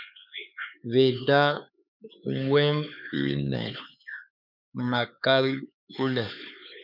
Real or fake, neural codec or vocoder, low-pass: fake; codec, 16 kHz, 4 kbps, X-Codec, HuBERT features, trained on LibriSpeech; 5.4 kHz